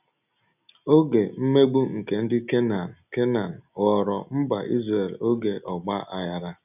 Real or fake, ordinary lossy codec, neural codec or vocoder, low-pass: real; none; none; 3.6 kHz